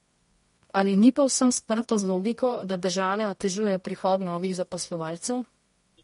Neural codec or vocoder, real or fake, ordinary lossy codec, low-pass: codec, 24 kHz, 0.9 kbps, WavTokenizer, medium music audio release; fake; MP3, 48 kbps; 10.8 kHz